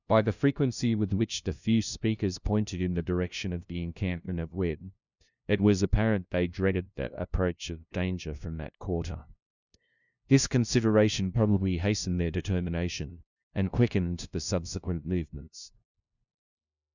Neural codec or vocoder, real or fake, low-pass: codec, 16 kHz, 0.5 kbps, FunCodec, trained on LibriTTS, 25 frames a second; fake; 7.2 kHz